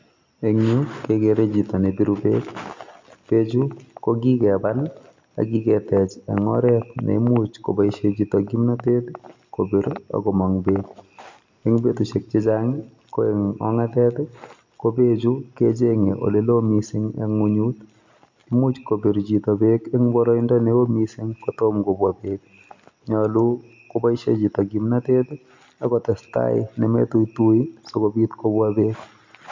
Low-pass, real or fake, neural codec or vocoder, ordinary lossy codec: 7.2 kHz; real; none; MP3, 48 kbps